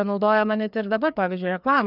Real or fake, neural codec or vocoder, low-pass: fake; codec, 16 kHz, 2 kbps, FreqCodec, larger model; 5.4 kHz